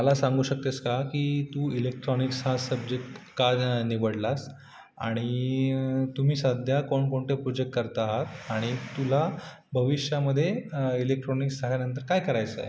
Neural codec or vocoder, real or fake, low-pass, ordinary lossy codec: none; real; none; none